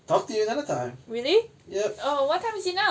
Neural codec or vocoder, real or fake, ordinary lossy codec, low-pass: none; real; none; none